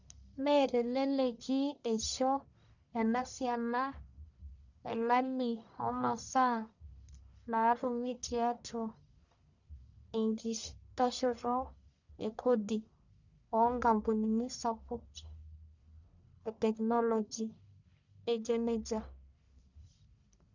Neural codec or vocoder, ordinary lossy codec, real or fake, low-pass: codec, 44.1 kHz, 1.7 kbps, Pupu-Codec; none; fake; 7.2 kHz